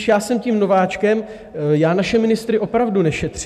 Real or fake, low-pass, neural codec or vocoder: real; 14.4 kHz; none